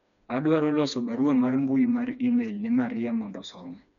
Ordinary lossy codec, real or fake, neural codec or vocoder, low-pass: none; fake; codec, 16 kHz, 2 kbps, FreqCodec, smaller model; 7.2 kHz